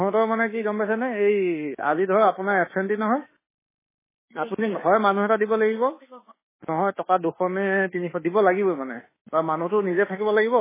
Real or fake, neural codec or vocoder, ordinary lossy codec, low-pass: fake; autoencoder, 48 kHz, 32 numbers a frame, DAC-VAE, trained on Japanese speech; MP3, 16 kbps; 3.6 kHz